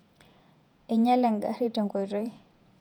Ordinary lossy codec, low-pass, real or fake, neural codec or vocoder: none; 19.8 kHz; fake; vocoder, 48 kHz, 128 mel bands, Vocos